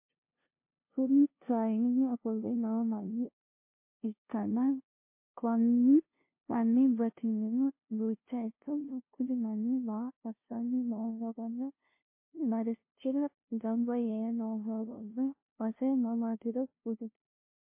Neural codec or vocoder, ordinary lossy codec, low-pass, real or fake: codec, 16 kHz, 0.5 kbps, FunCodec, trained on LibriTTS, 25 frames a second; MP3, 24 kbps; 3.6 kHz; fake